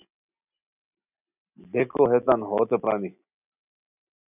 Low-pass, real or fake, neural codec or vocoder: 3.6 kHz; real; none